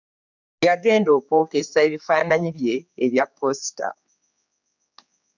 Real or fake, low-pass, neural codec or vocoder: fake; 7.2 kHz; codec, 16 kHz, 4 kbps, X-Codec, HuBERT features, trained on general audio